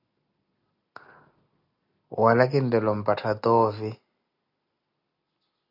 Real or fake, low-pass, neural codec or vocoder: real; 5.4 kHz; none